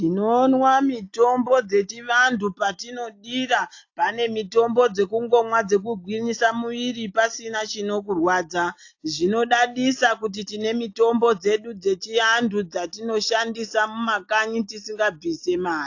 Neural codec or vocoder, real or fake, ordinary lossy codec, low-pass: none; real; AAC, 48 kbps; 7.2 kHz